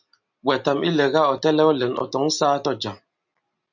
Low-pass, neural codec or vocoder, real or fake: 7.2 kHz; none; real